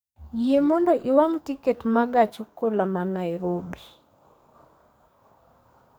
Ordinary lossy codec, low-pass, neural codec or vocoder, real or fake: none; none; codec, 44.1 kHz, 2.6 kbps, SNAC; fake